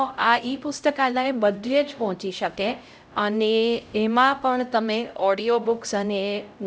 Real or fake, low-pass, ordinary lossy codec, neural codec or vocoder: fake; none; none; codec, 16 kHz, 0.5 kbps, X-Codec, HuBERT features, trained on LibriSpeech